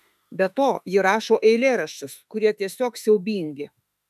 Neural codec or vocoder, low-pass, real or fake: autoencoder, 48 kHz, 32 numbers a frame, DAC-VAE, trained on Japanese speech; 14.4 kHz; fake